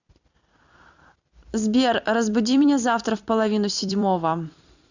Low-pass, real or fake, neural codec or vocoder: 7.2 kHz; fake; vocoder, 44.1 kHz, 128 mel bands every 256 samples, BigVGAN v2